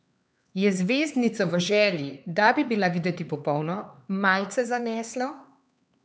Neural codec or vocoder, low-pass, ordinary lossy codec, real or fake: codec, 16 kHz, 4 kbps, X-Codec, HuBERT features, trained on LibriSpeech; none; none; fake